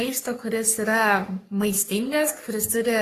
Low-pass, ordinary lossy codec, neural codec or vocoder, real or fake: 14.4 kHz; AAC, 48 kbps; codec, 44.1 kHz, 3.4 kbps, Pupu-Codec; fake